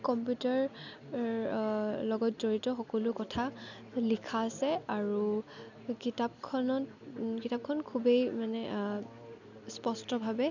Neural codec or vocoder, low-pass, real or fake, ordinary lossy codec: none; 7.2 kHz; real; none